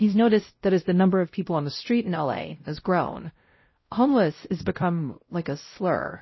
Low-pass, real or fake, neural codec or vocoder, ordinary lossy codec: 7.2 kHz; fake; codec, 16 kHz, 0.5 kbps, X-Codec, WavLM features, trained on Multilingual LibriSpeech; MP3, 24 kbps